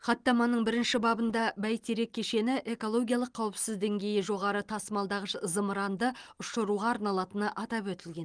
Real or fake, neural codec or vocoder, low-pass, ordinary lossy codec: real; none; 9.9 kHz; Opus, 24 kbps